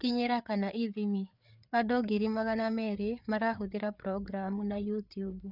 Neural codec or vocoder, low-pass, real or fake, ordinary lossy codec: codec, 16 kHz, 4 kbps, FreqCodec, larger model; 5.4 kHz; fake; none